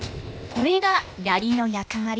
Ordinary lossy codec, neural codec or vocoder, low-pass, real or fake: none; codec, 16 kHz, 0.8 kbps, ZipCodec; none; fake